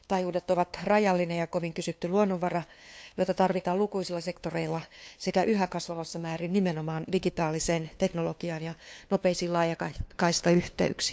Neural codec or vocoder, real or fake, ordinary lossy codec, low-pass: codec, 16 kHz, 2 kbps, FunCodec, trained on LibriTTS, 25 frames a second; fake; none; none